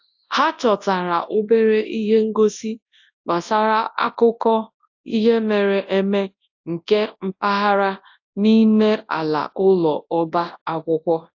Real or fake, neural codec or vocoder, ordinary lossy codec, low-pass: fake; codec, 24 kHz, 0.9 kbps, WavTokenizer, large speech release; AAC, 48 kbps; 7.2 kHz